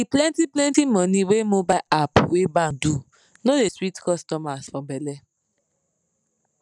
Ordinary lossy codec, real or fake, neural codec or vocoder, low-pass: none; real; none; 10.8 kHz